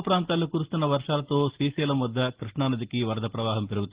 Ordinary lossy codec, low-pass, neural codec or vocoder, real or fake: Opus, 16 kbps; 3.6 kHz; none; real